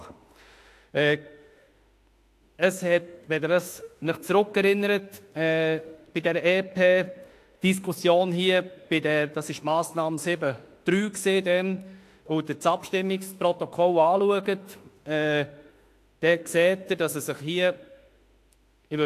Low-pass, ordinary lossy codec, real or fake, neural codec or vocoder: 14.4 kHz; AAC, 64 kbps; fake; autoencoder, 48 kHz, 32 numbers a frame, DAC-VAE, trained on Japanese speech